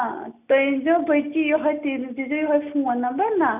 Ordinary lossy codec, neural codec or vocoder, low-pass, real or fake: none; none; 3.6 kHz; real